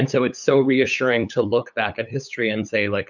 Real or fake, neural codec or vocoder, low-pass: fake; codec, 16 kHz, 8 kbps, FunCodec, trained on LibriTTS, 25 frames a second; 7.2 kHz